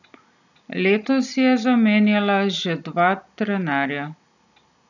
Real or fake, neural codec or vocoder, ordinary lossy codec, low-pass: real; none; none; 7.2 kHz